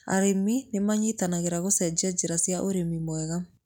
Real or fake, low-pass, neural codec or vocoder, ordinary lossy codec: real; 19.8 kHz; none; none